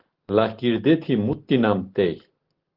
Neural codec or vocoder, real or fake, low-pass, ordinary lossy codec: none; real; 5.4 kHz; Opus, 16 kbps